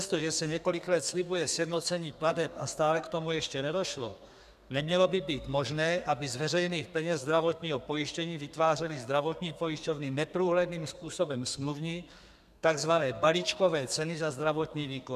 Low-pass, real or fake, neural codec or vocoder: 14.4 kHz; fake; codec, 32 kHz, 1.9 kbps, SNAC